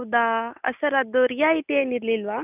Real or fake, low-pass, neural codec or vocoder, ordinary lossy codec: real; 3.6 kHz; none; none